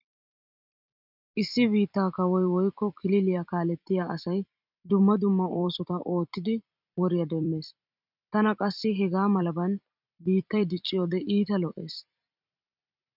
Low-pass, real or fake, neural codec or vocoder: 5.4 kHz; fake; vocoder, 44.1 kHz, 128 mel bands every 256 samples, BigVGAN v2